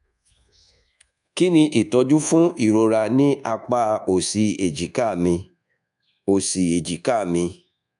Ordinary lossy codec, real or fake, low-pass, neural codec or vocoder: none; fake; 10.8 kHz; codec, 24 kHz, 1.2 kbps, DualCodec